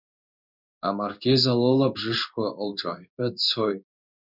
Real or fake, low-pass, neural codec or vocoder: fake; 5.4 kHz; codec, 16 kHz in and 24 kHz out, 1 kbps, XY-Tokenizer